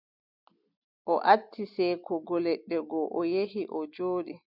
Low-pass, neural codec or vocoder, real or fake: 5.4 kHz; none; real